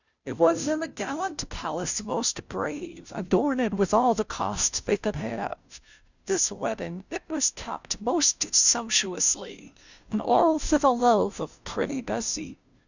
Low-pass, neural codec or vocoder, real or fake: 7.2 kHz; codec, 16 kHz, 0.5 kbps, FunCodec, trained on Chinese and English, 25 frames a second; fake